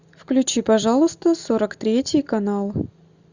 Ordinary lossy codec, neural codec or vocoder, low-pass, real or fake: Opus, 64 kbps; none; 7.2 kHz; real